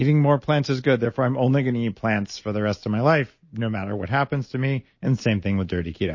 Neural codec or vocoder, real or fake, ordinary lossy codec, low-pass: none; real; MP3, 32 kbps; 7.2 kHz